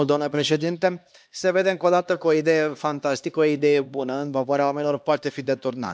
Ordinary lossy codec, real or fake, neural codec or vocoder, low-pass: none; fake; codec, 16 kHz, 2 kbps, X-Codec, HuBERT features, trained on LibriSpeech; none